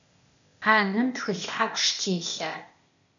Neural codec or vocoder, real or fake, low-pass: codec, 16 kHz, 0.8 kbps, ZipCodec; fake; 7.2 kHz